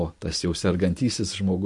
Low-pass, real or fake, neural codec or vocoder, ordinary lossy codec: 10.8 kHz; real; none; MP3, 48 kbps